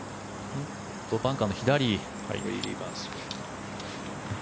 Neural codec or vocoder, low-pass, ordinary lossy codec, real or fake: none; none; none; real